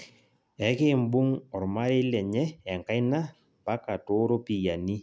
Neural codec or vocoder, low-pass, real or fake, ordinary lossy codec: none; none; real; none